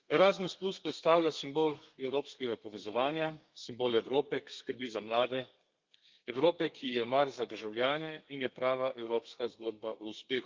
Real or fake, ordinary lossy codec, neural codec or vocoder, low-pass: fake; Opus, 24 kbps; codec, 32 kHz, 1.9 kbps, SNAC; 7.2 kHz